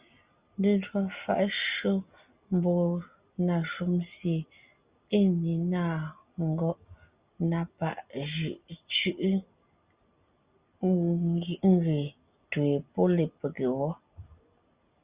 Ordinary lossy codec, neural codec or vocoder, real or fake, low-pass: Opus, 64 kbps; none; real; 3.6 kHz